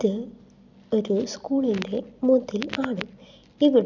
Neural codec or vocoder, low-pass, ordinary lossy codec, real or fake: none; 7.2 kHz; none; real